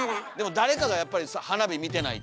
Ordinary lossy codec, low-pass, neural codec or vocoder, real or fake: none; none; none; real